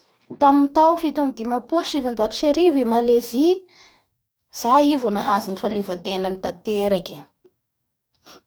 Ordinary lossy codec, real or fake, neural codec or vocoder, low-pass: none; fake; codec, 44.1 kHz, 2.6 kbps, DAC; none